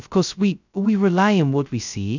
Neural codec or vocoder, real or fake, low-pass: codec, 16 kHz, 0.2 kbps, FocalCodec; fake; 7.2 kHz